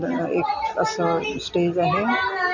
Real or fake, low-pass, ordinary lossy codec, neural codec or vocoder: real; 7.2 kHz; none; none